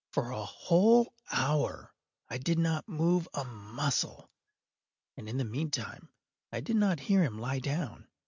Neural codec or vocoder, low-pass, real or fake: none; 7.2 kHz; real